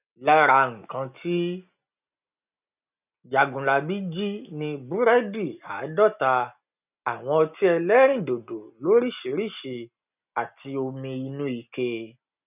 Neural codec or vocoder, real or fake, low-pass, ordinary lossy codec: none; real; 3.6 kHz; none